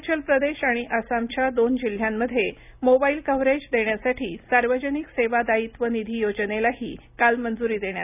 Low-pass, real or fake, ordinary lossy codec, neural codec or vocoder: 3.6 kHz; real; none; none